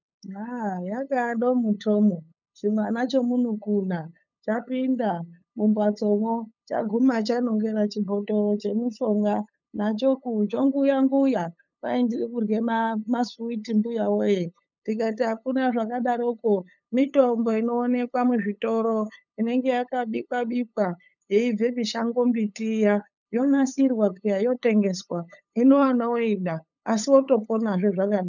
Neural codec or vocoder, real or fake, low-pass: codec, 16 kHz, 8 kbps, FunCodec, trained on LibriTTS, 25 frames a second; fake; 7.2 kHz